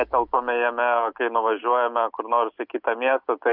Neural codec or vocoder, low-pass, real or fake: none; 5.4 kHz; real